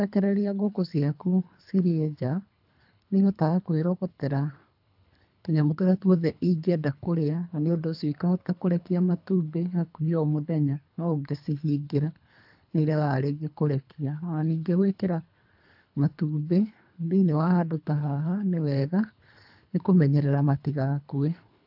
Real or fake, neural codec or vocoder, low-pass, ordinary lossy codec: fake; codec, 24 kHz, 3 kbps, HILCodec; 5.4 kHz; AAC, 48 kbps